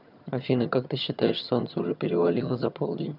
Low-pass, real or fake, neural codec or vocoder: 5.4 kHz; fake; vocoder, 22.05 kHz, 80 mel bands, HiFi-GAN